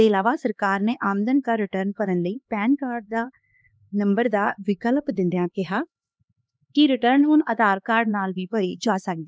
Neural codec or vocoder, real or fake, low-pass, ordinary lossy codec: codec, 16 kHz, 2 kbps, X-Codec, HuBERT features, trained on LibriSpeech; fake; none; none